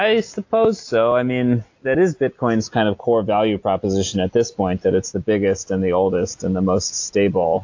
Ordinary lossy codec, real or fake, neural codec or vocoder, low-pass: AAC, 48 kbps; real; none; 7.2 kHz